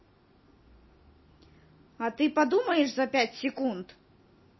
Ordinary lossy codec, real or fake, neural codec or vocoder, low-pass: MP3, 24 kbps; real; none; 7.2 kHz